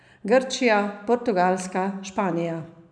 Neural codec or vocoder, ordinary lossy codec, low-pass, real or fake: none; none; 9.9 kHz; real